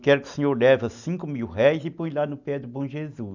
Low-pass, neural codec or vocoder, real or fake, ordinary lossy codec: 7.2 kHz; none; real; none